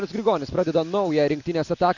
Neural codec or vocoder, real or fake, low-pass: none; real; 7.2 kHz